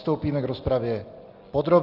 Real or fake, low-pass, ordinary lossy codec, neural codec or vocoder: real; 5.4 kHz; Opus, 24 kbps; none